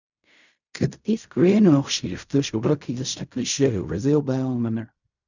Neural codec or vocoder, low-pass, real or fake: codec, 16 kHz in and 24 kHz out, 0.4 kbps, LongCat-Audio-Codec, fine tuned four codebook decoder; 7.2 kHz; fake